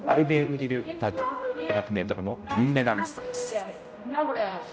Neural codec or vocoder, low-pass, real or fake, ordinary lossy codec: codec, 16 kHz, 0.5 kbps, X-Codec, HuBERT features, trained on general audio; none; fake; none